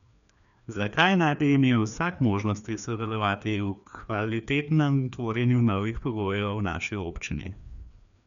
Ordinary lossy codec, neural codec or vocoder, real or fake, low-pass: none; codec, 16 kHz, 2 kbps, FreqCodec, larger model; fake; 7.2 kHz